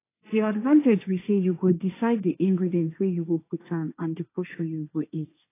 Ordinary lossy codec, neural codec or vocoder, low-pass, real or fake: AAC, 24 kbps; codec, 16 kHz, 1.1 kbps, Voila-Tokenizer; 3.6 kHz; fake